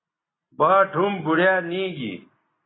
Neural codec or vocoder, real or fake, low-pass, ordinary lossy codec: none; real; 7.2 kHz; AAC, 16 kbps